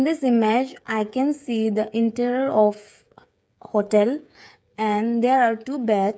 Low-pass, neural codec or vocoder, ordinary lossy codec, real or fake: none; codec, 16 kHz, 8 kbps, FreqCodec, smaller model; none; fake